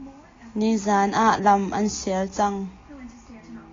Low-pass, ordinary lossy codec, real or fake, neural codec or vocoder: 7.2 kHz; AAC, 32 kbps; real; none